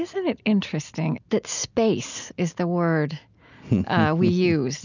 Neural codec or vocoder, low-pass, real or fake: none; 7.2 kHz; real